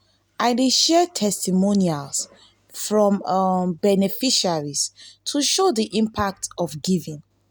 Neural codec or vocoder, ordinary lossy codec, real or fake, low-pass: none; none; real; none